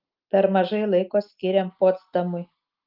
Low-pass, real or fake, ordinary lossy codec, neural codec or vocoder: 5.4 kHz; real; Opus, 32 kbps; none